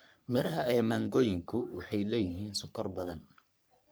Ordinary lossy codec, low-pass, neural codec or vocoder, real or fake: none; none; codec, 44.1 kHz, 3.4 kbps, Pupu-Codec; fake